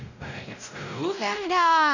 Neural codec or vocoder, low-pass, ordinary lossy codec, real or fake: codec, 16 kHz, 0.5 kbps, X-Codec, WavLM features, trained on Multilingual LibriSpeech; 7.2 kHz; none; fake